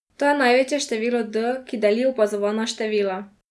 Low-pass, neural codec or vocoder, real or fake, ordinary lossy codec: none; none; real; none